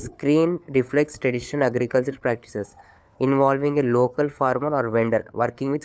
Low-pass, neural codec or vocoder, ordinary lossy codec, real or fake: none; codec, 16 kHz, 4 kbps, FunCodec, trained on LibriTTS, 50 frames a second; none; fake